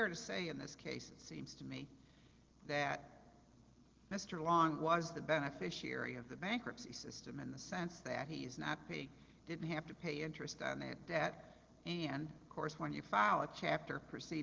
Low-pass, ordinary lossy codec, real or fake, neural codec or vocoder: 7.2 kHz; Opus, 16 kbps; real; none